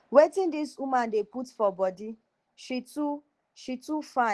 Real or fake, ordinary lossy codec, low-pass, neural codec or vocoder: real; Opus, 16 kbps; 10.8 kHz; none